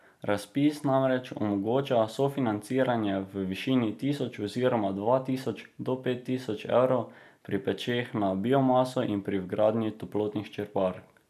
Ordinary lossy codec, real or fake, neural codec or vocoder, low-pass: none; real; none; 14.4 kHz